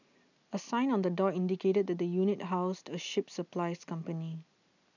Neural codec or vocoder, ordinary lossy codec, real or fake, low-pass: none; none; real; 7.2 kHz